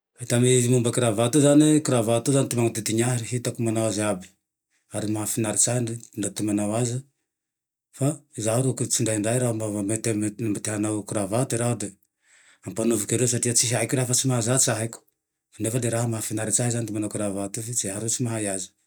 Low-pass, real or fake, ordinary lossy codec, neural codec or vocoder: none; real; none; none